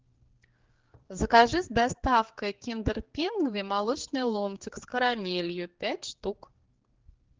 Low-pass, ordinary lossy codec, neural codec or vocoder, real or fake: 7.2 kHz; Opus, 16 kbps; codec, 16 kHz, 4 kbps, X-Codec, HuBERT features, trained on general audio; fake